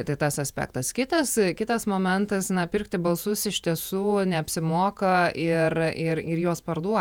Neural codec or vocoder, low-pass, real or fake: vocoder, 48 kHz, 128 mel bands, Vocos; 19.8 kHz; fake